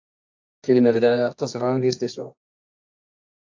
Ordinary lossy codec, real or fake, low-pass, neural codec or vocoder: AAC, 48 kbps; fake; 7.2 kHz; codec, 24 kHz, 0.9 kbps, WavTokenizer, medium music audio release